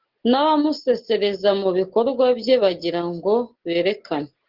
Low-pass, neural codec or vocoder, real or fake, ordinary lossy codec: 5.4 kHz; none; real; Opus, 16 kbps